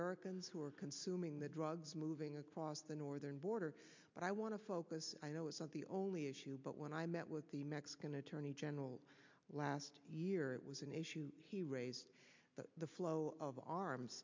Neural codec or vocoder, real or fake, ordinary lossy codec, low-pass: none; real; MP3, 64 kbps; 7.2 kHz